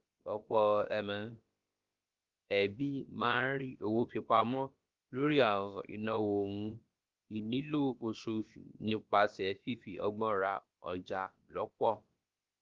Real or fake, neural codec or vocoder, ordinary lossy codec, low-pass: fake; codec, 16 kHz, about 1 kbps, DyCAST, with the encoder's durations; Opus, 32 kbps; 7.2 kHz